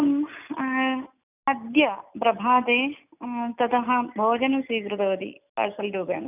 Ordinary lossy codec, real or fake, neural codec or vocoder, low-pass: none; real; none; 3.6 kHz